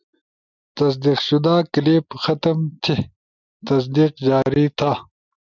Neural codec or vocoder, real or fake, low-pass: none; real; 7.2 kHz